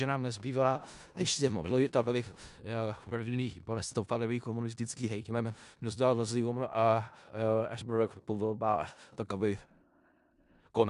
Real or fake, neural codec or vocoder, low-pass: fake; codec, 16 kHz in and 24 kHz out, 0.4 kbps, LongCat-Audio-Codec, four codebook decoder; 10.8 kHz